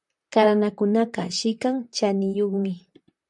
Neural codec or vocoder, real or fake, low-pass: vocoder, 44.1 kHz, 128 mel bands, Pupu-Vocoder; fake; 10.8 kHz